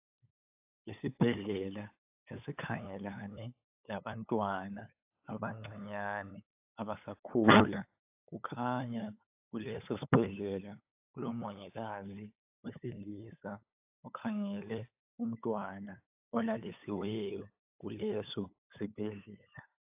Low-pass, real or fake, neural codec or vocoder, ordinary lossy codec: 3.6 kHz; fake; codec, 16 kHz, 8 kbps, FunCodec, trained on LibriTTS, 25 frames a second; AAC, 32 kbps